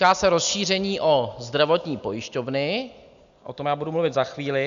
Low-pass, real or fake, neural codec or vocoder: 7.2 kHz; real; none